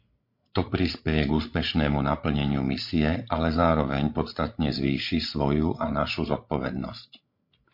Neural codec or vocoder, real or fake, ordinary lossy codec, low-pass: codec, 16 kHz, 16 kbps, FreqCodec, larger model; fake; MP3, 32 kbps; 5.4 kHz